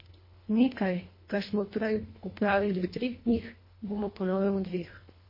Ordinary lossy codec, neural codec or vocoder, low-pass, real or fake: MP3, 24 kbps; codec, 24 kHz, 1.5 kbps, HILCodec; 5.4 kHz; fake